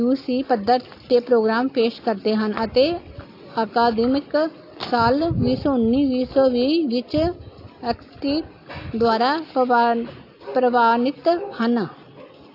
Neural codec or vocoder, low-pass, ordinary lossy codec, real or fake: none; 5.4 kHz; AAC, 32 kbps; real